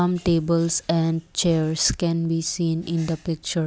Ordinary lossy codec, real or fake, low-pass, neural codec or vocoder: none; real; none; none